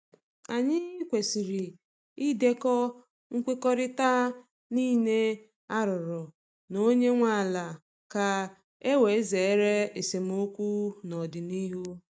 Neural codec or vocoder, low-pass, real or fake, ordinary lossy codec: none; none; real; none